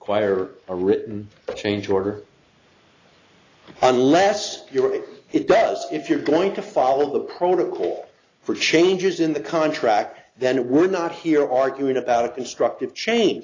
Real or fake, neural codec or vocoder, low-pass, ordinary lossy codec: real; none; 7.2 kHz; AAC, 32 kbps